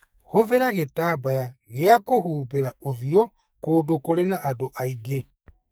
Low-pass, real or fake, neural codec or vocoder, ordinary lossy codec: none; fake; codec, 44.1 kHz, 2.6 kbps, SNAC; none